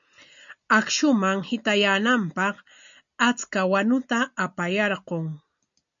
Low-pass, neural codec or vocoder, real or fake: 7.2 kHz; none; real